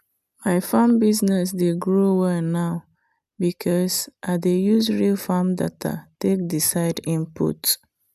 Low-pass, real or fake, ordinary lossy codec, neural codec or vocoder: 14.4 kHz; real; none; none